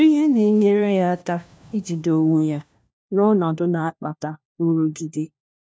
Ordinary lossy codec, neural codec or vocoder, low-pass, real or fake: none; codec, 16 kHz, 1 kbps, FunCodec, trained on LibriTTS, 50 frames a second; none; fake